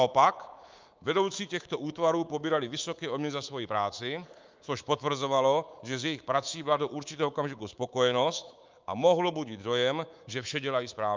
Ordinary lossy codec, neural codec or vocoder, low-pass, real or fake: Opus, 24 kbps; codec, 24 kHz, 3.1 kbps, DualCodec; 7.2 kHz; fake